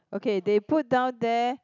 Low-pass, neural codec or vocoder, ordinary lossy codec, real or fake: 7.2 kHz; none; none; real